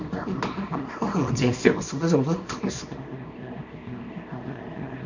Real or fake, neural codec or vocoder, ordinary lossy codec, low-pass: fake; codec, 24 kHz, 0.9 kbps, WavTokenizer, small release; none; 7.2 kHz